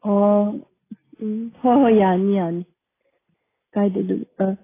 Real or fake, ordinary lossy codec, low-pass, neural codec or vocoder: real; AAC, 16 kbps; 3.6 kHz; none